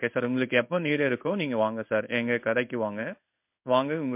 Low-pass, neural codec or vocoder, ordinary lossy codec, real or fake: 3.6 kHz; codec, 16 kHz in and 24 kHz out, 1 kbps, XY-Tokenizer; MP3, 32 kbps; fake